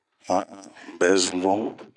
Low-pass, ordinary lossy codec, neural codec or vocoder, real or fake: 10.8 kHz; none; none; real